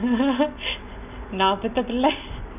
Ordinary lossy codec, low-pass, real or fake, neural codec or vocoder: none; 3.6 kHz; real; none